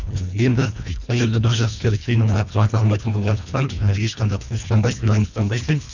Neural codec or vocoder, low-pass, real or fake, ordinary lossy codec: codec, 24 kHz, 1.5 kbps, HILCodec; 7.2 kHz; fake; none